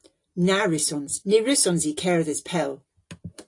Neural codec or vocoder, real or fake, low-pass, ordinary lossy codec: none; real; 10.8 kHz; AAC, 48 kbps